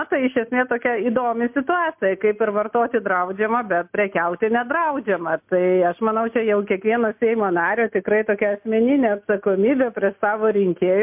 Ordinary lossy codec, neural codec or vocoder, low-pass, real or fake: MP3, 32 kbps; none; 3.6 kHz; real